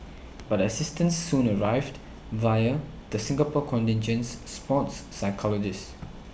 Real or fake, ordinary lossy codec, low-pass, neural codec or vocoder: real; none; none; none